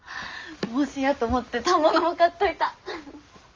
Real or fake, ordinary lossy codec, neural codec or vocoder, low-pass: real; Opus, 32 kbps; none; 7.2 kHz